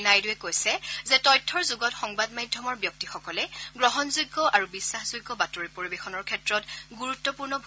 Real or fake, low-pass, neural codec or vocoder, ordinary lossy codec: real; none; none; none